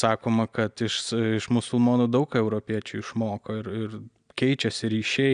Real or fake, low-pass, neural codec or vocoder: real; 9.9 kHz; none